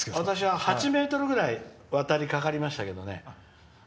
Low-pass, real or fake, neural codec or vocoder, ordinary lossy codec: none; real; none; none